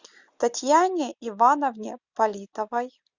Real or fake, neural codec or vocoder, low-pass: real; none; 7.2 kHz